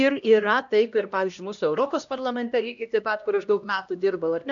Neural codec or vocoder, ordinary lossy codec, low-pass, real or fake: codec, 16 kHz, 1 kbps, X-Codec, HuBERT features, trained on LibriSpeech; MP3, 96 kbps; 7.2 kHz; fake